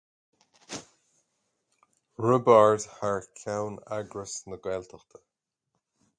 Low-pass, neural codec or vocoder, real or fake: 9.9 kHz; none; real